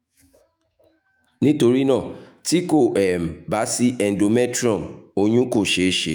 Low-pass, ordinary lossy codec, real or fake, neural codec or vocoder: none; none; fake; autoencoder, 48 kHz, 128 numbers a frame, DAC-VAE, trained on Japanese speech